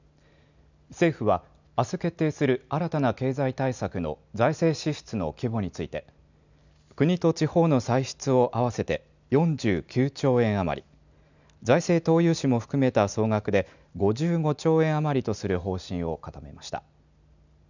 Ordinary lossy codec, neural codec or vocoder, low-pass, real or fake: none; none; 7.2 kHz; real